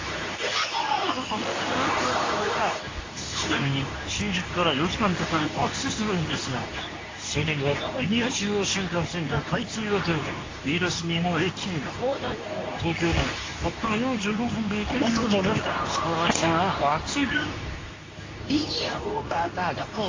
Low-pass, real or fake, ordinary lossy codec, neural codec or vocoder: 7.2 kHz; fake; AAC, 32 kbps; codec, 24 kHz, 0.9 kbps, WavTokenizer, medium speech release version 2